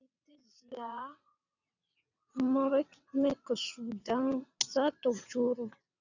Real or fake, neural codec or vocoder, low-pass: fake; vocoder, 22.05 kHz, 80 mel bands, WaveNeXt; 7.2 kHz